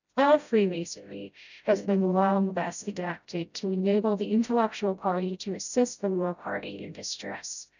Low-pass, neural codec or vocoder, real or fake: 7.2 kHz; codec, 16 kHz, 0.5 kbps, FreqCodec, smaller model; fake